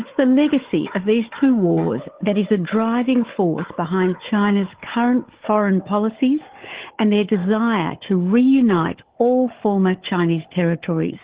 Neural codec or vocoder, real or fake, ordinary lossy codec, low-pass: codec, 16 kHz, 4 kbps, FunCodec, trained on Chinese and English, 50 frames a second; fake; Opus, 16 kbps; 3.6 kHz